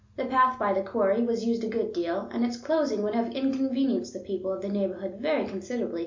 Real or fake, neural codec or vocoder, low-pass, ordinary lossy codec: real; none; 7.2 kHz; MP3, 64 kbps